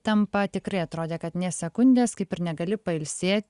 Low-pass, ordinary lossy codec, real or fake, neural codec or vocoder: 10.8 kHz; Opus, 64 kbps; real; none